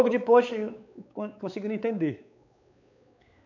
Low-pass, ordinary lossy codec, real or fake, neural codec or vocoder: 7.2 kHz; none; fake; codec, 16 kHz, 4 kbps, X-Codec, WavLM features, trained on Multilingual LibriSpeech